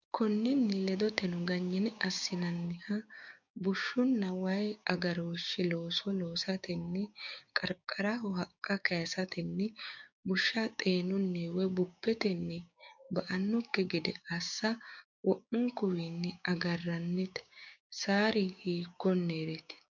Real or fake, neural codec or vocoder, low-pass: fake; codec, 16 kHz, 6 kbps, DAC; 7.2 kHz